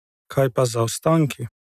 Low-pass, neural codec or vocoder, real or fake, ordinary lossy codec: 14.4 kHz; none; real; none